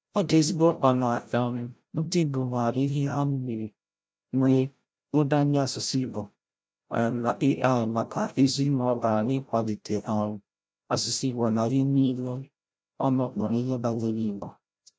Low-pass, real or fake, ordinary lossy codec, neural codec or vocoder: none; fake; none; codec, 16 kHz, 0.5 kbps, FreqCodec, larger model